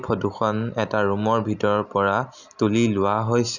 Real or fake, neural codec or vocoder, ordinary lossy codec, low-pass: real; none; none; 7.2 kHz